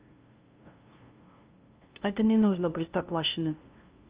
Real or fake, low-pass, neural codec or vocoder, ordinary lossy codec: fake; 3.6 kHz; codec, 16 kHz, 0.5 kbps, FunCodec, trained on LibriTTS, 25 frames a second; Opus, 24 kbps